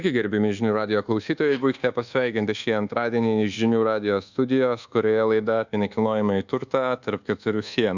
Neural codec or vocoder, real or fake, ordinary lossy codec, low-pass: codec, 24 kHz, 1.2 kbps, DualCodec; fake; Opus, 64 kbps; 7.2 kHz